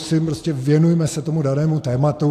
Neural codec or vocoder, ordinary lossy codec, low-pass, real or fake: none; AAC, 64 kbps; 14.4 kHz; real